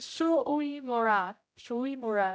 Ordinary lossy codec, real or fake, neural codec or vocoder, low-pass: none; fake; codec, 16 kHz, 0.5 kbps, X-Codec, HuBERT features, trained on general audio; none